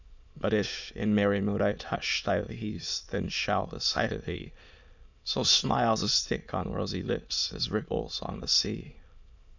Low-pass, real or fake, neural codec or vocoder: 7.2 kHz; fake; autoencoder, 22.05 kHz, a latent of 192 numbers a frame, VITS, trained on many speakers